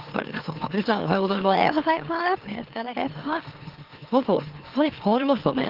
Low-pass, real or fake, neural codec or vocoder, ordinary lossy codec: 5.4 kHz; fake; autoencoder, 44.1 kHz, a latent of 192 numbers a frame, MeloTTS; Opus, 16 kbps